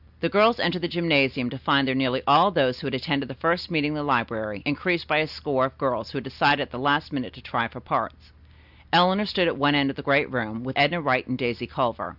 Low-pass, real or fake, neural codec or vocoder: 5.4 kHz; real; none